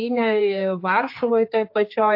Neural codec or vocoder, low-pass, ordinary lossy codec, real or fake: codec, 16 kHz, 4 kbps, X-Codec, HuBERT features, trained on general audio; 5.4 kHz; MP3, 32 kbps; fake